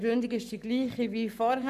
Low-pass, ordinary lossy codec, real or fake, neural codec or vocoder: 14.4 kHz; none; fake; codec, 44.1 kHz, 7.8 kbps, Pupu-Codec